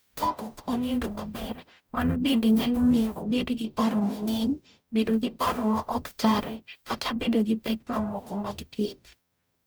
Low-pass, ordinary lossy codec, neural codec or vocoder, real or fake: none; none; codec, 44.1 kHz, 0.9 kbps, DAC; fake